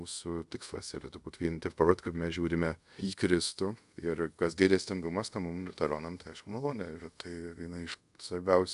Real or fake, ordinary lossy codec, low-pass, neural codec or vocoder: fake; AAC, 96 kbps; 10.8 kHz; codec, 24 kHz, 0.5 kbps, DualCodec